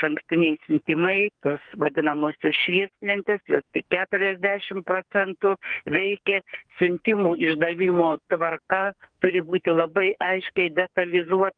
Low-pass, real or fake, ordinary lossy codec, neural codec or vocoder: 9.9 kHz; fake; Opus, 24 kbps; codec, 44.1 kHz, 2.6 kbps, SNAC